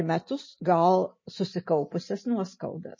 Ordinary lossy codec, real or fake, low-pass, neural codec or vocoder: MP3, 32 kbps; real; 7.2 kHz; none